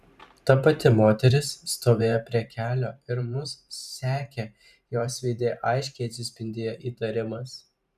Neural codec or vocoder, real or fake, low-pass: vocoder, 44.1 kHz, 128 mel bands every 512 samples, BigVGAN v2; fake; 14.4 kHz